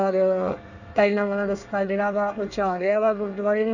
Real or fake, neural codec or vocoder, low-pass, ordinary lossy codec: fake; codec, 24 kHz, 1 kbps, SNAC; 7.2 kHz; none